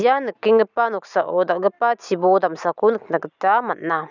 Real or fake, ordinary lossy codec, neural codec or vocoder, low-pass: real; none; none; 7.2 kHz